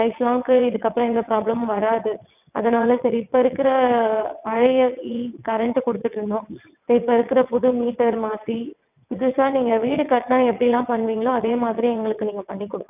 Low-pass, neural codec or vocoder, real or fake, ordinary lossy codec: 3.6 kHz; vocoder, 22.05 kHz, 80 mel bands, WaveNeXt; fake; none